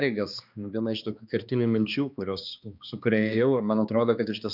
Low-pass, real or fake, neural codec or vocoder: 5.4 kHz; fake; codec, 16 kHz, 2 kbps, X-Codec, HuBERT features, trained on balanced general audio